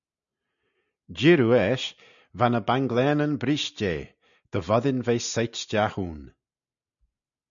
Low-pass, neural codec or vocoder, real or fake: 7.2 kHz; none; real